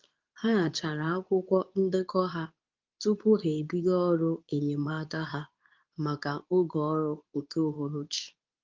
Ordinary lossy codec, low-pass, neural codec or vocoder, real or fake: Opus, 32 kbps; 7.2 kHz; codec, 24 kHz, 0.9 kbps, WavTokenizer, medium speech release version 2; fake